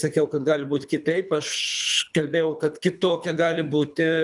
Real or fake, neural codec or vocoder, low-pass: fake; codec, 24 kHz, 3 kbps, HILCodec; 10.8 kHz